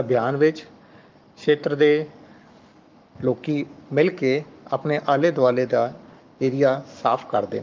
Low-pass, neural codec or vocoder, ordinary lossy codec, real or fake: 7.2 kHz; codec, 44.1 kHz, 7.8 kbps, Pupu-Codec; Opus, 32 kbps; fake